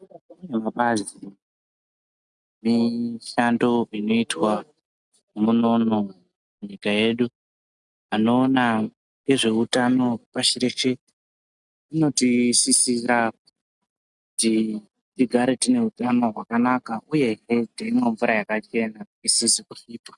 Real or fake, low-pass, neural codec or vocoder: real; 10.8 kHz; none